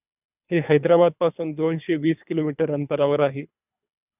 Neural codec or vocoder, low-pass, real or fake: codec, 24 kHz, 3 kbps, HILCodec; 3.6 kHz; fake